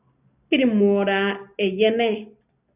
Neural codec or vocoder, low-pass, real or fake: none; 3.6 kHz; real